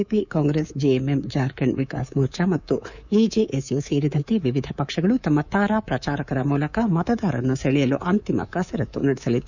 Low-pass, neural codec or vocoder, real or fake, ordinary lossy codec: 7.2 kHz; codec, 24 kHz, 3.1 kbps, DualCodec; fake; none